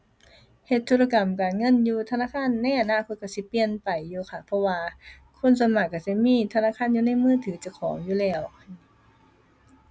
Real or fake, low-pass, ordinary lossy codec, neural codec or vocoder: real; none; none; none